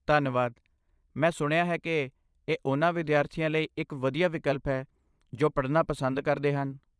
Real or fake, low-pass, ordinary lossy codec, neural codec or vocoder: fake; none; none; vocoder, 22.05 kHz, 80 mel bands, Vocos